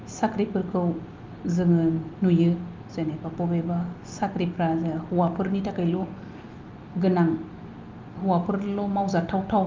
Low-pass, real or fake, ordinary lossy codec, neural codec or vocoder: 7.2 kHz; real; Opus, 24 kbps; none